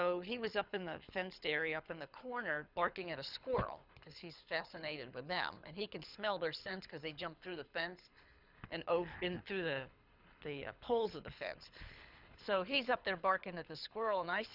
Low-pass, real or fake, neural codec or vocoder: 5.4 kHz; fake; codec, 24 kHz, 6 kbps, HILCodec